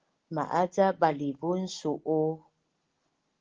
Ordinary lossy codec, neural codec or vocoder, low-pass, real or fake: Opus, 16 kbps; none; 7.2 kHz; real